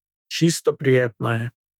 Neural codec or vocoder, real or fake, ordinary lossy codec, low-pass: autoencoder, 48 kHz, 32 numbers a frame, DAC-VAE, trained on Japanese speech; fake; none; 19.8 kHz